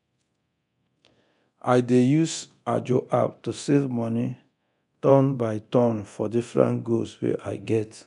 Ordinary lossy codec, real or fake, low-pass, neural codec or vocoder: none; fake; 10.8 kHz; codec, 24 kHz, 0.9 kbps, DualCodec